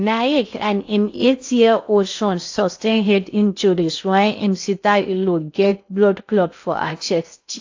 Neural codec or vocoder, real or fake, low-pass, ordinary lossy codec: codec, 16 kHz in and 24 kHz out, 0.6 kbps, FocalCodec, streaming, 4096 codes; fake; 7.2 kHz; AAC, 48 kbps